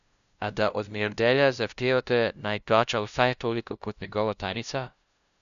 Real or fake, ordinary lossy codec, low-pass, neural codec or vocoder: fake; none; 7.2 kHz; codec, 16 kHz, 0.5 kbps, FunCodec, trained on LibriTTS, 25 frames a second